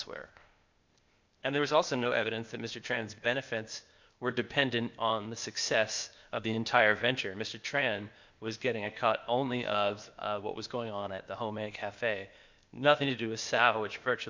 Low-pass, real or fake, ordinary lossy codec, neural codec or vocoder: 7.2 kHz; fake; MP3, 64 kbps; codec, 16 kHz, 0.8 kbps, ZipCodec